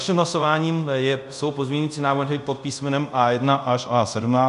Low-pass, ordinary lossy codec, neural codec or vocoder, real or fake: 10.8 kHz; MP3, 96 kbps; codec, 24 kHz, 0.5 kbps, DualCodec; fake